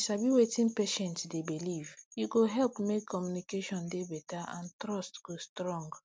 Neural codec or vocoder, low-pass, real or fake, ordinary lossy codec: none; none; real; none